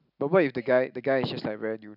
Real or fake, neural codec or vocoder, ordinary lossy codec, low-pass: real; none; none; 5.4 kHz